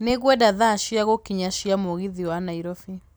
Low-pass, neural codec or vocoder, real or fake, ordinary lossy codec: none; none; real; none